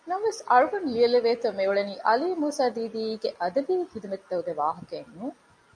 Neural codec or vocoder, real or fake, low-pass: none; real; 9.9 kHz